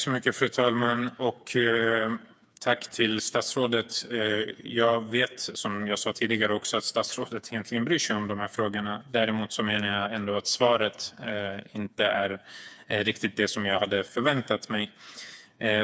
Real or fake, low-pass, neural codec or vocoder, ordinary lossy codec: fake; none; codec, 16 kHz, 4 kbps, FreqCodec, smaller model; none